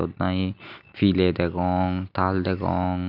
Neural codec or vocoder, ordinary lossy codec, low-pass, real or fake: none; none; 5.4 kHz; real